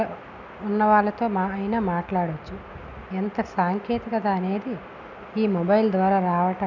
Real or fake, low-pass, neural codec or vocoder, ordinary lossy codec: real; 7.2 kHz; none; none